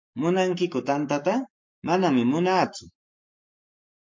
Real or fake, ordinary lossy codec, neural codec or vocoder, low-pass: fake; MP3, 48 kbps; codec, 44.1 kHz, 7.8 kbps, Pupu-Codec; 7.2 kHz